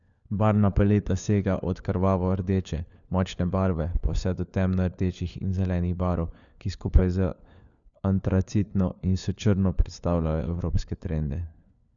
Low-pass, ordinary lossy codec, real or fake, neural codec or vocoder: 7.2 kHz; none; fake; codec, 16 kHz, 4 kbps, FunCodec, trained on LibriTTS, 50 frames a second